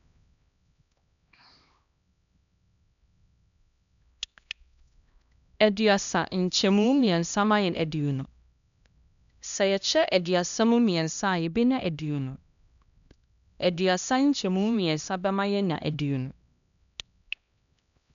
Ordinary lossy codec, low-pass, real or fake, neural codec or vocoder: none; 7.2 kHz; fake; codec, 16 kHz, 1 kbps, X-Codec, HuBERT features, trained on LibriSpeech